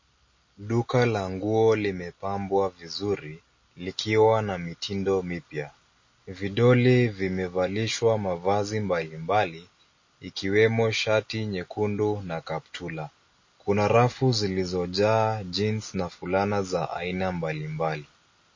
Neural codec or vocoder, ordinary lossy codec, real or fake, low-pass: none; MP3, 32 kbps; real; 7.2 kHz